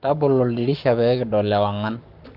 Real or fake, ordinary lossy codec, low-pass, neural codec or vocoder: fake; Opus, 32 kbps; 5.4 kHz; codec, 44.1 kHz, 7.8 kbps, Pupu-Codec